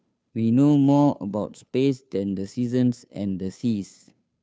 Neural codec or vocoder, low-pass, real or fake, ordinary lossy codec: codec, 16 kHz, 2 kbps, FunCodec, trained on Chinese and English, 25 frames a second; none; fake; none